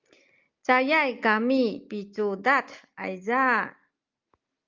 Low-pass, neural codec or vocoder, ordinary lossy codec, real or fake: 7.2 kHz; none; Opus, 24 kbps; real